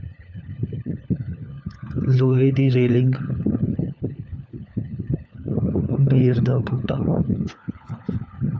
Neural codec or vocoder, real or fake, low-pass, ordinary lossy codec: codec, 16 kHz, 4 kbps, FunCodec, trained on LibriTTS, 50 frames a second; fake; none; none